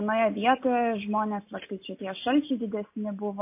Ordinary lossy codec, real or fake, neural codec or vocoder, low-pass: MP3, 24 kbps; real; none; 3.6 kHz